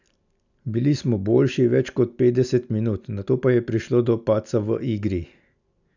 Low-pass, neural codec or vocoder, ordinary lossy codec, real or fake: 7.2 kHz; none; none; real